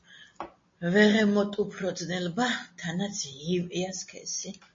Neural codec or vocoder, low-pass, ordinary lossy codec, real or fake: none; 7.2 kHz; MP3, 32 kbps; real